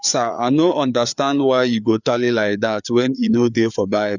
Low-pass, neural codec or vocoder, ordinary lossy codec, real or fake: 7.2 kHz; codec, 16 kHz, 4 kbps, FreqCodec, larger model; none; fake